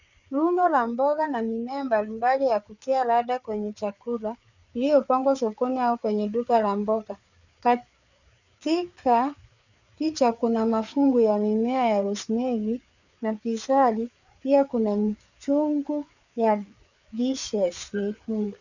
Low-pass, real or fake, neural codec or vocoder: 7.2 kHz; fake; codec, 16 kHz, 4 kbps, FreqCodec, larger model